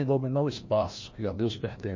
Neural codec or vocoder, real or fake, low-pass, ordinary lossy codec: codec, 16 kHz, 1 kbps, FreqCodec, larger model; fake; 7.2 kHz; MP3, 32 kbps